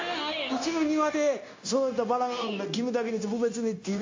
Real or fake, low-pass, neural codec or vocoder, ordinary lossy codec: fake; 7.2 kHz; codec, 16 kHz, 0.9 kbps, LongCat-Audio-Codec; AAC, 48 kbps